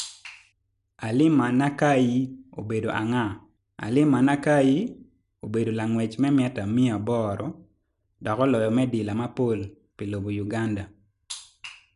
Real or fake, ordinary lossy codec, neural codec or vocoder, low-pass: real; none; none; 10.8 kHz